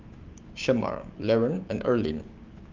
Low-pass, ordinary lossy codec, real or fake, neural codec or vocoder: 7.2 kHz; Opus, 24 kbps; fake; codec, 16 kHz, 6 kbps, DAC